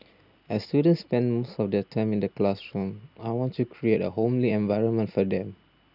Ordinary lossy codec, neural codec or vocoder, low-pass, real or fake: none; none; 5.4 kHz; real